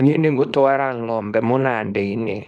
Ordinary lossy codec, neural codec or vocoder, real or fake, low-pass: none; codec, 24 kHz, 0.9 kbps, WavTokenizer, small release; fake; none